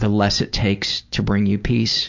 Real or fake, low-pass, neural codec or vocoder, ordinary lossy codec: real; 7.2 kHz; none; MP3, 64 kbps